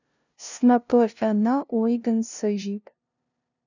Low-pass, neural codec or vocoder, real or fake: 7.2 kHz; codec, 16 kHz, 0.5 kbps, FunCodec, trained on LibriTTS, 25 frames a second; fake